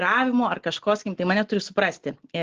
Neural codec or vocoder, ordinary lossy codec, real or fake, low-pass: none; Opus, 16 kbps; real; 7.2 kHz